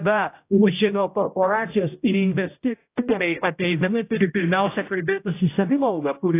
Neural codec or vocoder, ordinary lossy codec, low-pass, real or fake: codec, 16 kHz, 0.5 kbps, X-Codec, HuBERT features, trained on general audio; AAC, 24 kbps; 3.6 kHz; fake